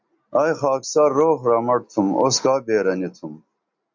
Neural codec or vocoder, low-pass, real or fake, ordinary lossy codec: none; 7.2 kHz; real; MP3, 64 kbps